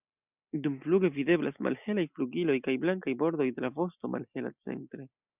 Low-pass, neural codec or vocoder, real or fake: 3.6 kHz; none; real